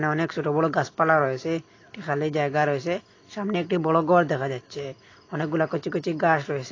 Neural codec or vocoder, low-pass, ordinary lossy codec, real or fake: none; 7.2 kHz; AAC, 32 kbps; real